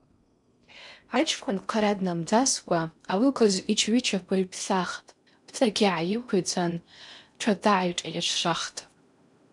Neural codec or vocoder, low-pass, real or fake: codec, 16 kHz in and 24 kHz out, 0.6 kbps, FocalCodec, streaming, 4096 codes; 10.8 kHz; fake